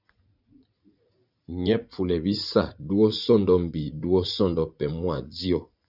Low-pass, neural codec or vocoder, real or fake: 5.4 kHz; vocoder, 44.1 kHz, 80 mel bands, Vocos; fake